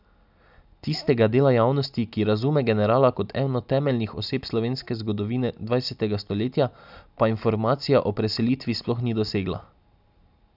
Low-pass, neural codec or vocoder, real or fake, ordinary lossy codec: 5.4 kHz; none; real; none